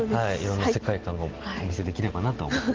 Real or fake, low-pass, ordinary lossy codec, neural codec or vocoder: real; 7.2 kHz; Opus, 16 kbps; none